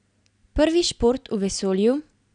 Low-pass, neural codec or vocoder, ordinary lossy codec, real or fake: 9.9 kHz; none; none; real